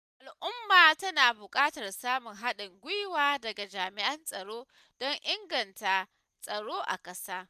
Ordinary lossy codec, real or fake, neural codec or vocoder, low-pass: none; real; none; 14.4 kHz